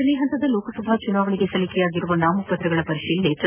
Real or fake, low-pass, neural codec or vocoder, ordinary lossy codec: real; 3.6 kHz; none; none